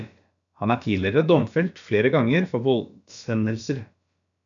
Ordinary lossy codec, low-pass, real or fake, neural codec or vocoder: MP3, 96 kbps; 7.2 kHz; fake; codec, 16 kHz, about 1 kbps, DyCAST, with the encoder's durations